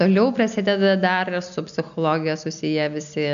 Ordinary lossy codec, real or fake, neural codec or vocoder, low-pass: MP3, 96 kbps; real; none; 7.2 kHz